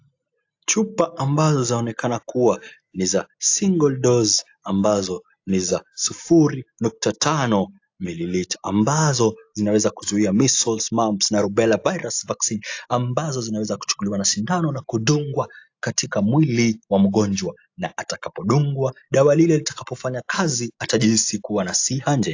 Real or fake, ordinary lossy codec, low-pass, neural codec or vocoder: real; AAC, 48 kbps; 7.2 kHz; none